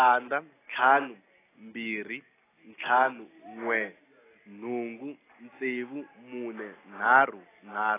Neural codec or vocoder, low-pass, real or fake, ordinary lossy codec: none; 3.6 kHz; real; AAC, 16 kbps